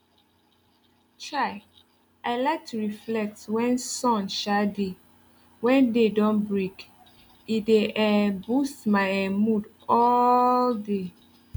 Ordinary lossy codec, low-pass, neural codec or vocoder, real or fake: none; none; none; real